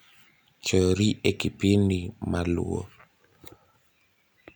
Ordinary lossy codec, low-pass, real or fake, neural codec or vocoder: none; none; real; none